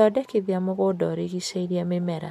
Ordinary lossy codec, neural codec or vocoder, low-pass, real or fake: MP3, 96 kbps; none; 14.4 kHz; real